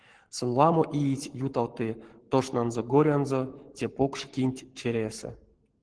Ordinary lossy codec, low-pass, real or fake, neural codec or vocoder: Opus, 16 kbps; 9.9 kHz; fake; codec, 44.1 kHz, 7.8 kbps, Pupu-Codec